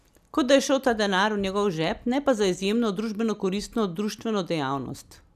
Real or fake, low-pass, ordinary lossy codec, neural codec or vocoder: real; 14.4 kHz; none; none